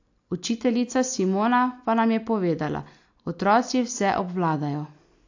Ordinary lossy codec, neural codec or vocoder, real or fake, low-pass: AAC, 48 kbps; none; real; 7.2 kHz